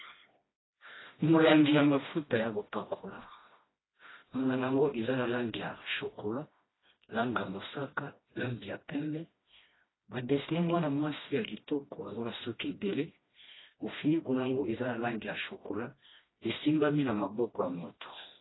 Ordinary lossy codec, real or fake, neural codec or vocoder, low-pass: AAC, 16 kbps; fake; codec, 16 kHz, 1 kbps, FreqCodec, smaller model; 7.2 kHz